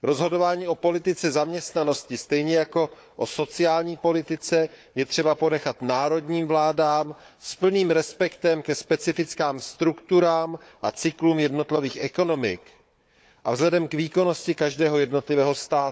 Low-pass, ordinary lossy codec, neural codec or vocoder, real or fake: none; none; codec, 16 kHz, 4 kbps, FunCodec, trained on Chinese and English, 50 frames a second; fake